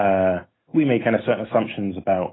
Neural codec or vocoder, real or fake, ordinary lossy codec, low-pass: none; real; AAC, 16 kbps; 7.2 kHz